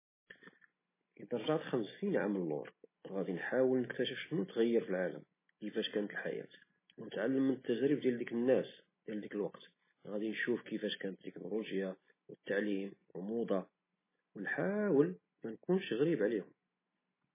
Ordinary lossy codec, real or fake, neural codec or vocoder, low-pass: MP3, 16 kbps; real; none; 3.6 kHz